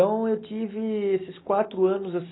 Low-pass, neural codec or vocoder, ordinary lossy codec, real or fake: 7.2 kHz; none; AAC, 16 kbps; real